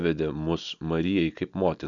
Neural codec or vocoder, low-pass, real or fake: none; 7.2 kHz; real